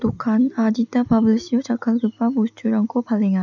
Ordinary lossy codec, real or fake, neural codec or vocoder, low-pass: none; real; none; 7.2 kHz